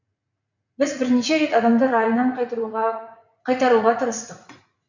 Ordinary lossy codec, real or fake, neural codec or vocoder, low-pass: none; fake; vocoder, 44.1 kHz, 80 mel bands, Vocos; 7.2 kHz